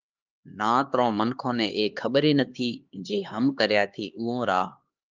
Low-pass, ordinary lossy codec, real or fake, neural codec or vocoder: 7.2 kHz; Opus, 32 kbps; fake; codec, 16 kHz, 2 kbps, X-Codec, HuBERT features, trained on LibriSpeech